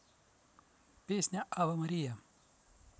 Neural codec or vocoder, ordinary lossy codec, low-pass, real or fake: none; none; none; real